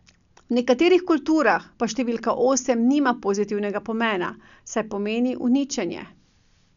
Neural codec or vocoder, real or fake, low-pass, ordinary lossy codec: none; real; 7.2 kHz; none